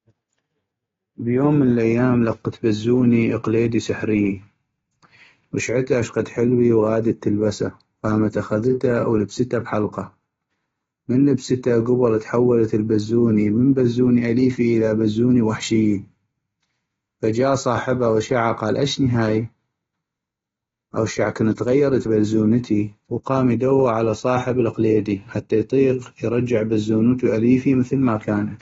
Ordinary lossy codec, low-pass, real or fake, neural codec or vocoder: AAC, 24 kbps; 7.2 kHz; real; none